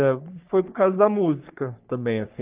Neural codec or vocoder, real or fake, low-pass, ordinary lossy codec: codec, 44.1 kHz, 3.4 kbps, Pupu-Codec; fake; 3.6 kHz; Opus, 24 kbps